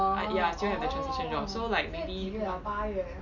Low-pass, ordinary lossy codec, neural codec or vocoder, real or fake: 7.2 kHz; none; none; real